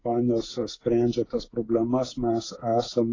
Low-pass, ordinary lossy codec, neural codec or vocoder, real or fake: 7.2 kHz; AAC, 32 kbps; codec, 16 kHz, 8 kbps, FreqCodec, smaller model; fake